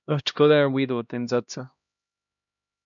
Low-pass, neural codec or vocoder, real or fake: 7.2 kHz; codec, 16 kHz, 1 kbps, X-Codec, HuBERT features, trained on LibriSpeech; fake